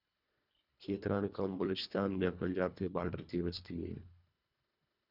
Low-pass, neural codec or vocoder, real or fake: 5.4 kHz; codec, 24 kHz, 1.5 kbps, HILCodec; fake